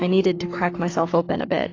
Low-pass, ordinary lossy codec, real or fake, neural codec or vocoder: 7.2 kHz; AAC, 32 kbps; fake; codec, 44.1 kHz, 7.8 kbps, Pupu-Codec